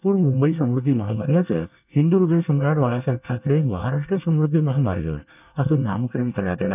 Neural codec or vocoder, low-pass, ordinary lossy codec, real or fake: codec, 24 kHz, 1 kbps, SNAC; 3.6 kHz; none; fake